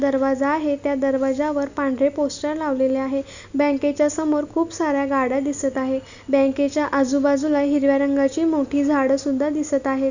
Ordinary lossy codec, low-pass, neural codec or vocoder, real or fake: none; 7.2 kHz; none; real